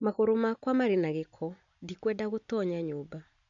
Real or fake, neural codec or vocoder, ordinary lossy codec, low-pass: real; none; none; 7.2 kHz